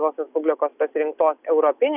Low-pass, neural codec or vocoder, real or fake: 3.6 kHz; none; real